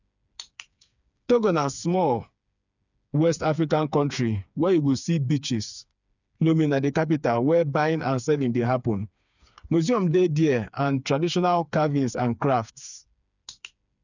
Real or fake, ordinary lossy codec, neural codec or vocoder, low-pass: fake; none; codec, 16 kHz, 4 kbps, FreqCodec, smaller model; 7.2 kHz